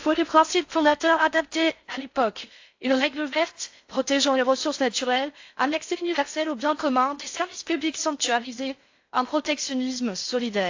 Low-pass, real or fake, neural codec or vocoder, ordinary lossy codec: 7.2 kHz; fake; codec, 16 kHz in and 24 kHz out, 0.6 kbps, FocalCodec, streaming, 4096 codes; AAC, 48 kbps